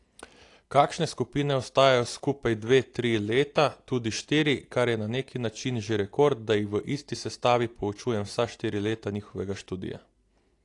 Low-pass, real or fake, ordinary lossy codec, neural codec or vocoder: 10.8 kHz; real; AAC, 48 kbps; none